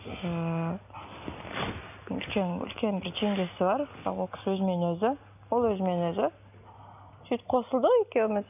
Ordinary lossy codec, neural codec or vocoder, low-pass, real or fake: none; none; 3.6 kHz; real